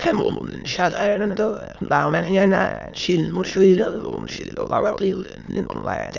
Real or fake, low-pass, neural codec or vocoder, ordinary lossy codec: fake; 7.2 kHz; autoencoder, 22.05 kHz, a latent of 192 numbers a frame, VITS, trained on many speakers; none